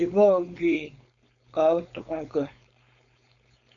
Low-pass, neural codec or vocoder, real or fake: 7.2 kHz; codec, 16 kHz, 4.8 kbps, FACodec; fake